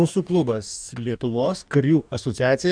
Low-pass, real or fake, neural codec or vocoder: 9.9 kHz; fake; codec, 44.1 kHz, 2.6 kbps, DAC